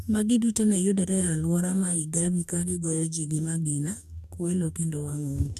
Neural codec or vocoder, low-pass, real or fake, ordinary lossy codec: codec, 44.1 kHz, 2.6 kbps, DAC; 14.4 kHz; fake; none